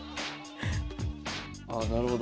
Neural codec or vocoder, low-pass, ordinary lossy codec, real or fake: none; none; none; real